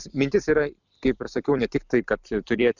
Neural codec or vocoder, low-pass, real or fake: vocoder, 44.1 kHz, 128 mel bands every 256 samples, BigVGAN v2; 7.2 kHz; fake